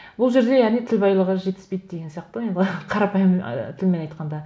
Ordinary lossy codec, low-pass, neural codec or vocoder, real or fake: none; none; none; real